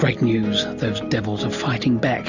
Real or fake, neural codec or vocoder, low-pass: real; none; 7.2 kHz